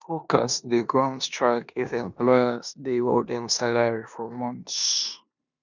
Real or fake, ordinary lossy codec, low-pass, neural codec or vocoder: fake; none; 7.2 kHz; codec, 16 kHz in and 24 kHz out, 0.9 kbps, LongCat-Audio-Codec, four codebook decoder